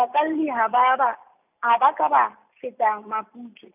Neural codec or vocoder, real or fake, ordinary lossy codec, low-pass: none; real; none; 3.6 kHz